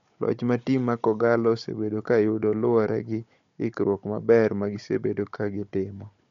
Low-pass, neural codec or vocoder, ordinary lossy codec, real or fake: 7.2 kHz; codec, 16 kHz, 16 kbps, FunCodec, trained on Chinese and English, 50 frames a second; MP3, 48 kbps; fake